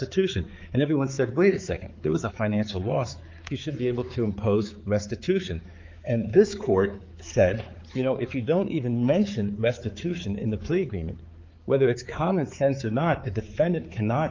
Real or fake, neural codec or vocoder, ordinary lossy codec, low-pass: fake; codec, 16 kHz, 4 kbps, X-Codec, HuBERT features, trained on balanced general audio; Opus, 32 kbps; 7.2 kHz